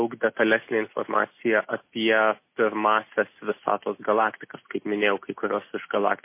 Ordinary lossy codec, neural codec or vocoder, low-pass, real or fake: MP3, 24 kbps; none; 3.6 kHz; real